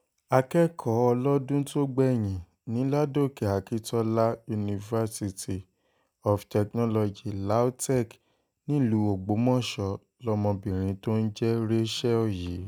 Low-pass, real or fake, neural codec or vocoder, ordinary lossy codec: none; real; none; none